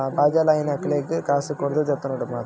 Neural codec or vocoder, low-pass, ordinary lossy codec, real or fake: none; none; none; real